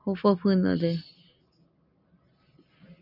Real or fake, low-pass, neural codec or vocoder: real; 5.4 kHz; none